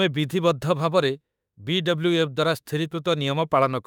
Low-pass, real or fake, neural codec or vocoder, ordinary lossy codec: 19.8 kHz; fake; autoencoder, 48 kHz, 32 numbers a frame, DAC-VAE, trained on Japanese speech; none